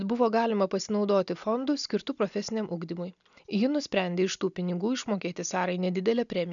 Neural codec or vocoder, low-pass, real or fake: none; 7.2 kHz; real